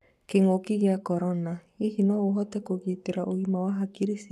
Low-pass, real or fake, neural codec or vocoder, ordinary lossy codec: 14.4 kHz; fake; codec, 44.1 kHz, 7.8 kbps, Pupu-Codec; none